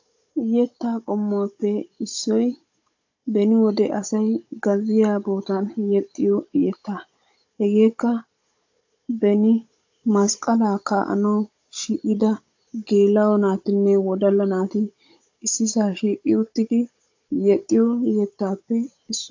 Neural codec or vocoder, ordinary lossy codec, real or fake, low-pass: codec, 16 kHz, 16 kbps, FunCodec, trained on Chinese and English, 50 frames a second; AAC, 48 kbps; fake; 7.2 kHz